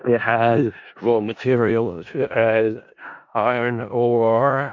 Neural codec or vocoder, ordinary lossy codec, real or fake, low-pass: codec, 16 kHz in and 24 kHz out, 0.4 kbps, LongCat-Audio-Codec, four codebook decoder; MP3, 48 kbps; fake; 7.2 kHz